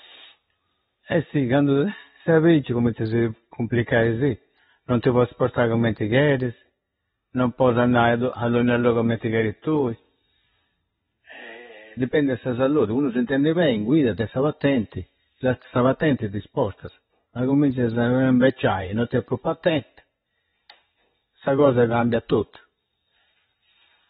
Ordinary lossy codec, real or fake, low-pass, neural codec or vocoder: AAC, 16 kbps; real; 19.8 kHz; none